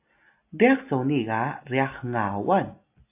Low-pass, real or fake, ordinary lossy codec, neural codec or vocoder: 3.6 kHz; real; AAC, 32 kbps; none